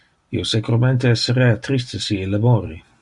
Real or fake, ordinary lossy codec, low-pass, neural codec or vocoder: real; Opus, 64 kbps; 10.8 kHz; none